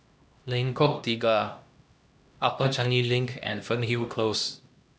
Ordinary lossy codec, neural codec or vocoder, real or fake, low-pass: none; codec, 16 kHz, 1 kbps, X-Codec, HuBERT features, trained on LibriSpeech; fake; none